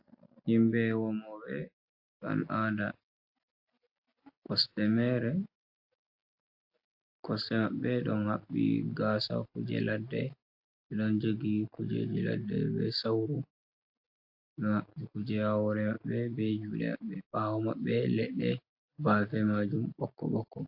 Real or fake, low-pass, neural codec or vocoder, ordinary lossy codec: real; 5.4 kHz; none; AAC, 48 kbps